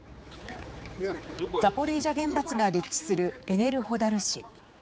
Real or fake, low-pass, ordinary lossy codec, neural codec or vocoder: fake; none; none; codec, 16 kHz, 4 kbps, X-Codec, HuBERT features, trained on general audio